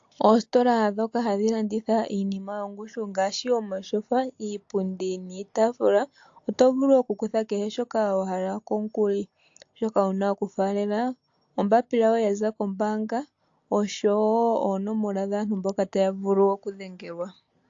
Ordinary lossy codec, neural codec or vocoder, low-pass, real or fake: AAC, 48 kbps; none; 7.2 kHz; real